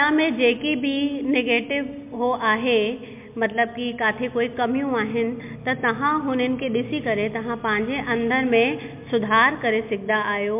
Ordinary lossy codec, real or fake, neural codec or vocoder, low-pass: MP3, 32 kbps; real; none; 3.6 kHz